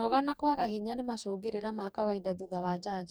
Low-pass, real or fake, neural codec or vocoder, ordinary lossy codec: none; fake; codec, 44.1 kHz, 2.6 kbps, DAC; none